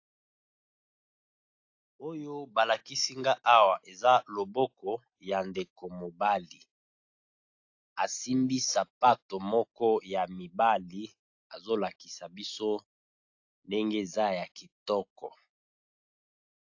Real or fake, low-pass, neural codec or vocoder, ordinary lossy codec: real; 7.2 kHz; none; AAC, 48 kbps